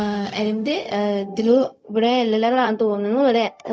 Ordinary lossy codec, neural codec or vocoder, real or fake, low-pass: none; codec, 16 kHz, 0.4 kbps, LongCat-Audio-Codec; fake; none